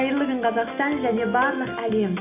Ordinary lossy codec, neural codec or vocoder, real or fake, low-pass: none; none; real; 3.6 kHz